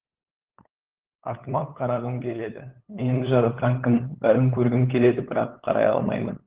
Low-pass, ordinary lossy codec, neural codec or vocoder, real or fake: 3.6 kHz; Opus, 16 kbps; codec, 16 kHz, 8 kbps, FunCodec, trained on LibriTTS, 25 frames a second; fake